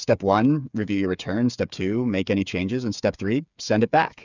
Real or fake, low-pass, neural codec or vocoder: fake; 7.2 kHz; codec, 16 kHz, 8 kbps, FreqCodec, smaller model